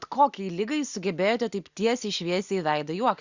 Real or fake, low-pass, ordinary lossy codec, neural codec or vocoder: real; 7.2 kHz; Opus, 64 kbps; none